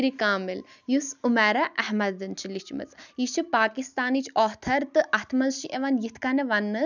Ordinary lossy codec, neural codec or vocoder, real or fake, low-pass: none; none; real; 7.2 kHz